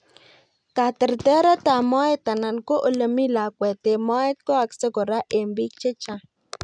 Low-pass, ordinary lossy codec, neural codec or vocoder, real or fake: 9.9 kHz; none; none; real